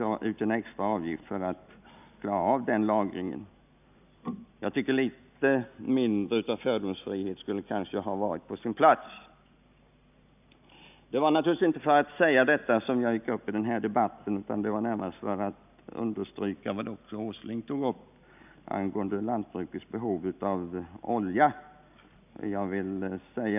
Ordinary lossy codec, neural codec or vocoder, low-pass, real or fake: none; none; 3.6 kHz; real